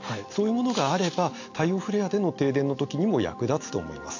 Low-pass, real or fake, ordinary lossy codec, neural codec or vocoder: 7.2 kHz; real; AAC, 48 kbps; none